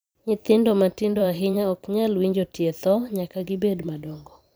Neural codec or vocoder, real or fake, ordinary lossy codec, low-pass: vocoder, 44.1 kHz, 128 mel bands every 512 samples, BigVGAN v2; fake; none; none